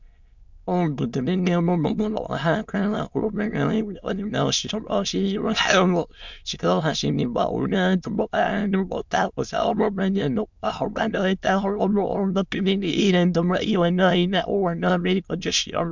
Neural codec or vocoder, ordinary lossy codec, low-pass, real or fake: autoencoder, 22.05 kHz, a latent of 192 numbers a frame, VITS, trained on many speakers; MP3, 64 kbps; 7.2 kHz; fake